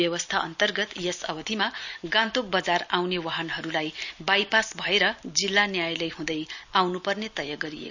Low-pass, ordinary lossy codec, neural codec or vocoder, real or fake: 7.2 kHz; none; none; real